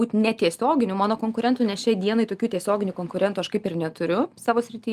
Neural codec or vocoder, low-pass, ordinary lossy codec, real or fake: none; 14.4 kHz; Opus, 32 kbps; real